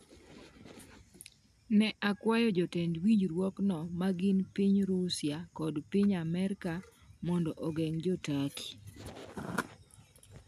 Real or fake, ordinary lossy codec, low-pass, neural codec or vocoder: real; none; 14.4 kHz; none